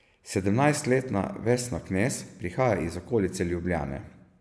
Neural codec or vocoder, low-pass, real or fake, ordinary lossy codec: none; none; real; none